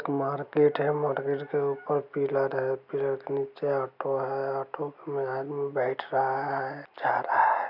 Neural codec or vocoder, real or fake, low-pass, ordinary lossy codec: none; real; 5.4 kHz; none